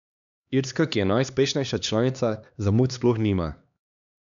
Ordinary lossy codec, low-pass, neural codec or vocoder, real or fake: none; 7.2 kHz; codec, 16 kHz, 2 kbps, X-Codec, HuBERT features, trained on LibriSpeech; fake